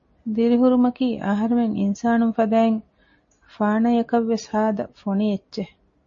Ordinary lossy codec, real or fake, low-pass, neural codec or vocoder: MP3, 32 kbps; real; 7.2 kHz; none